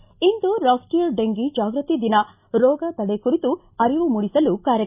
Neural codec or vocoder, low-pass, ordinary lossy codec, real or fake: none; 3.6 kHz; none; real